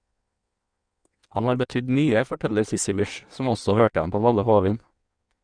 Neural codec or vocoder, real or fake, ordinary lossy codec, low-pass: codec, 16 kHz in and 24 kHz out, 1.1 kbps, FireRedTTS-2 codec; fake; Opus, 64 kbps; 9.9 kHz